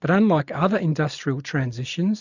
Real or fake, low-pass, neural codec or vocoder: real; 7.2 kHz; none